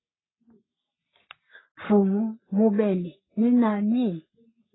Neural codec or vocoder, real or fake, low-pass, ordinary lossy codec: codec, 44.1 kHz, 3.4 kbps, Pupu-Codec; fake; 7.2 kHz; AAC, 16 kbps